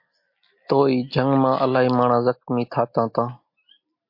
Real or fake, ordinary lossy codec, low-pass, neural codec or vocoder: real; MP3, 32 kbps; 5.4 kHz; none